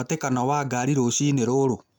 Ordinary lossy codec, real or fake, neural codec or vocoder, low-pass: none; real; none; none